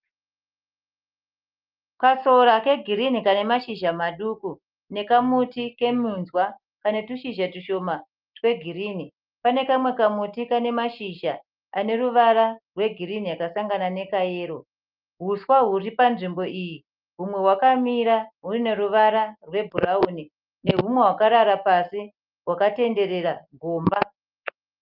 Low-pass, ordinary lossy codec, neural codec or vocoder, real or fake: 5.4 kHz; Opus, 24 kbps; none; real